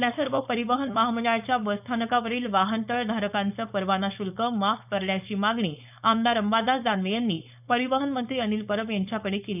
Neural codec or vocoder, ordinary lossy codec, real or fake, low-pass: codec, 16 kHz, 4.8 kbps, FACodec; none; fake; 3.6 kHz